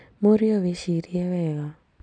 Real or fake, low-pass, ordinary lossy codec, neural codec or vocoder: real; 9.9 kHz; MP3, 96 kbps; none